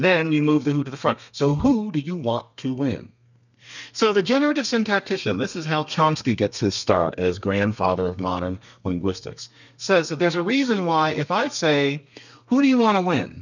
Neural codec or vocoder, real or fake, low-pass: codec, 32 kHz, 1.9 kbps, SNAC; fake; 7.2 kHz